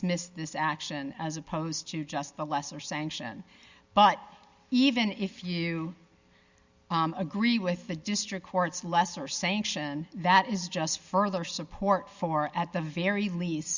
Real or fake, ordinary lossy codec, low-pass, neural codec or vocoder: real; Opus, 64 kbps; 7.2 kHz; none